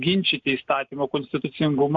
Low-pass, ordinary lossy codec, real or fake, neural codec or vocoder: 7.2 kHz; MP3, 64 kbps; real; none